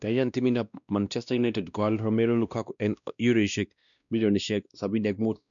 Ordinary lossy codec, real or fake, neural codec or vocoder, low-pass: none; fake; codec, 16 kHz, 1 kbps, X-Codec, WavLM features, trained on Multilingual LibriSpeech; 7.2 kHz